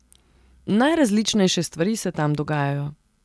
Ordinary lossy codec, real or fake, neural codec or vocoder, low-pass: none; real; none; none